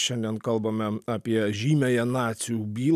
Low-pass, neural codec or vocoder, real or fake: 14.4 kHz; none; real